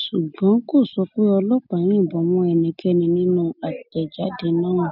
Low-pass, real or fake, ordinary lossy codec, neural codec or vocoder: 5.4 kHz; real; none; none